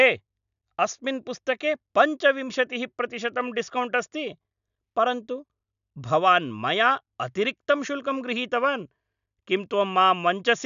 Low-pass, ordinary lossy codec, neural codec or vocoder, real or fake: 7.2 kHz; none; none; real